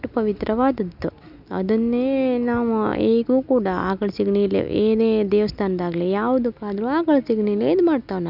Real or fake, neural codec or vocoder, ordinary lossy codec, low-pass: real; none; none; 5.4 kHz